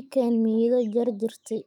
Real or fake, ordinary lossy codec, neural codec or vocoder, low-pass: fake; none; autoencoder, 48 kHz, 128 numbers a frame, DAC-VAE, trained on Japanese speech; 19.8 kHz